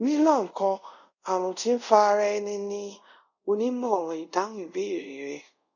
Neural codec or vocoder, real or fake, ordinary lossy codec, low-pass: codec, 24 kHz, 0.5 kbps, DualCodec; fake; none; 7.2 kHz